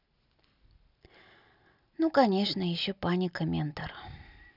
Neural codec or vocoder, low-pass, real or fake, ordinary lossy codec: none; 5.4 kHz; real; none